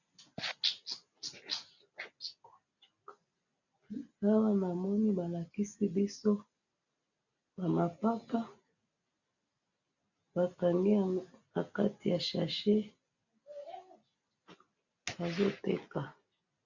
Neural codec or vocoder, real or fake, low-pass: none; real; 7.2 kHz